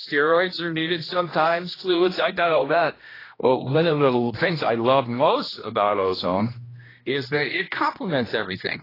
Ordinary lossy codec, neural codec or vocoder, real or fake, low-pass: AAC, 24 kbps; codec, 16 kHz, 1 kbps, X-Codec, HuBERT features, trained on general audio; fake; 5.4 kHz